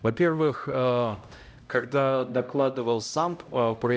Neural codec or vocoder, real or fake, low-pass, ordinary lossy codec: codec, 16 kHz, 0.5 kbps, X-Codec, HuBERT features, trained on LibriSpeech; fake; none; none